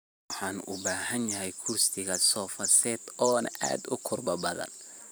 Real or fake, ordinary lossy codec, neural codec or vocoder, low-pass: fake; none; vocoder, 44.1 kHz, 128 mel bands every 512 samples, BigVGAN v2; none